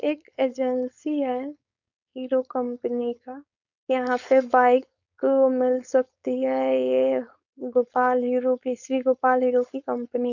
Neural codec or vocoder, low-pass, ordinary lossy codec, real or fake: codec, 16 kHz, 4.8 kbps, FACodec; 7.2 kHz; none; fake